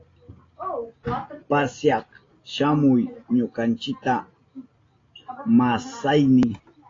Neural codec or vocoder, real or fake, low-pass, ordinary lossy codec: none; real; 7.2 kHz; AAC, 32 kbps